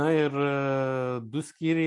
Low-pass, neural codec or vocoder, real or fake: 10.8 kHz; none; real